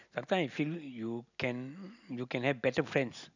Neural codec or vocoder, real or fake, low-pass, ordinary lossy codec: none; real; 7.2 kHz; none